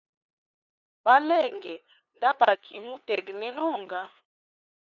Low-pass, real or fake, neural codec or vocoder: 7.2 kHz; fake; codec, 16 kHz, 2 kbps, FunCodec, trained on LibriTTS, 25 frames a second